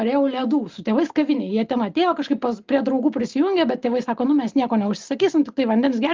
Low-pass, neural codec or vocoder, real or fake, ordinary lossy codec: 7.2 kHz; none; real; Opus, 32 kbps